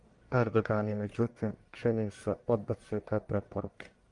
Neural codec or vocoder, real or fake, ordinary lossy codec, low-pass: codec, 44.1 kHz, 1.7 kbps, Pupu-Codec; fake; Opus, 32 kbps; 10.8 kHz